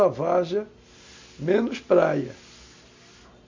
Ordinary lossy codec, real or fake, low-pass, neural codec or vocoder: MP3, 64 kbps; real; 7.2 kHz; none